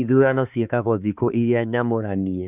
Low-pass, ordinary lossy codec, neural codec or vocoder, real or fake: 3.6 kHz; none; codec, 16 kHz, 4 kbps, X-Codec, HuBERT features, trained on balanced general audio; fake